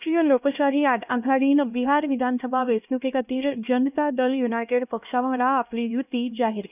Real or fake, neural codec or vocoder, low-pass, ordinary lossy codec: fake; codec, 16 kHz, 1 kbps, X-Codec, HuBERT features, trained on LibriSpeech; 3.6 kHz; none